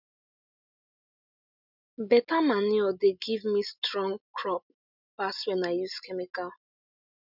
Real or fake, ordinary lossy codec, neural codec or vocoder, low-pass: real; none; none; 5.4 kHz